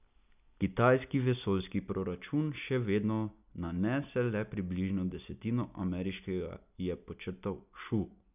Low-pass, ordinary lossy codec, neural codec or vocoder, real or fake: 3.6 kHz; none; none; real